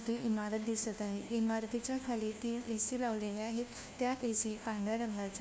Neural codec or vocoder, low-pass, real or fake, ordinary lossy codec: codec, 16 kHz, 1 kbps, FunCodec, trained on LibriTTS, 50 frames a second; none; fake; none